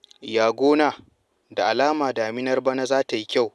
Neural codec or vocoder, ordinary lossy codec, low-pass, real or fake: none; none; none; real